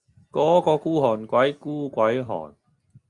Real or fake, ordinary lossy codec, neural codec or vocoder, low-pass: real; Opus, 64 kbps; none; 10.8 kHz